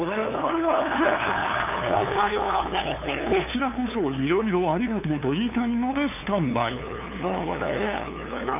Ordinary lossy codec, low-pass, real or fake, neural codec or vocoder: none; 3.6 kHz; fake; codec, 16 kHz, 2 kbps, FunCodec, trained on LibriTTS, 25 frames a second